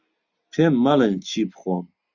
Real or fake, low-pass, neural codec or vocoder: real; 7.2 kHz; none